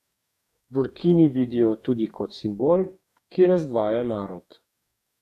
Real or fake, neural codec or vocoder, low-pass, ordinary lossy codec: fake; codec, 44.1 kHz, 2.6 kbps, DAC; 14.4 kHz; none